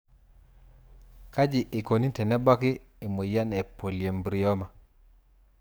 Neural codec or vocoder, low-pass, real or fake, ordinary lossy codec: codec, 44.1 kHz, 7.8 kbps, DAC; none; fake; none